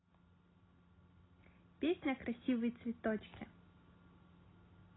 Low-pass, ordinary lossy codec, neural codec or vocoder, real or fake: 7.2 kHz; AAC, 16 kbps; none; real